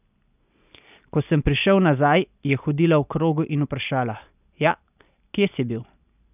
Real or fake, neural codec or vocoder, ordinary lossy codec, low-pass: real; none; none; 3.6 kHz